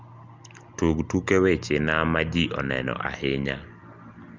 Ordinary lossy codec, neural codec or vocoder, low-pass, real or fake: Opus, 24 kbps; none; 7.2 kHz; real